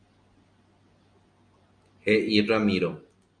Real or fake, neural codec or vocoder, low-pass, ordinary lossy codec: real; none; 9.9 kHz; MP3, 48 kbps